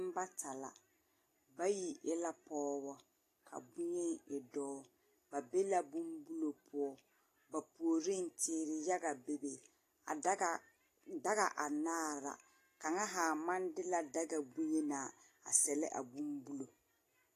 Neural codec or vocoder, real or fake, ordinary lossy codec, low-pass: none; real; AAC, 48 kbps; 14.4 kHz